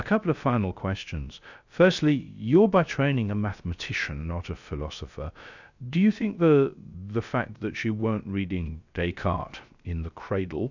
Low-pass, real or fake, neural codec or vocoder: 7.2 kHz; fake; codec, 16 kHz, 0.3 kbps, FocalCodec